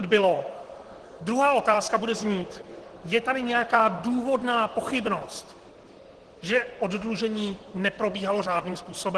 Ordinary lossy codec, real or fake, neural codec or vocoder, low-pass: Opus, 16 kbps; fake; vocoder, 44.1 kHz, 128 mel bands, Pupu-Vocoder; 10.8 kHz